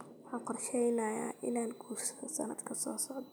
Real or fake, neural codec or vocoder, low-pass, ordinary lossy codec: real; none; none; none